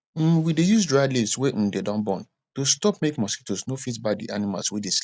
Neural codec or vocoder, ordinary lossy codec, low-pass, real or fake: none; none; none; real